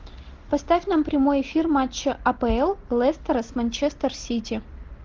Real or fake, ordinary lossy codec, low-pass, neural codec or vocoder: real; Opus, 16 kbps; 7.2 kHz; none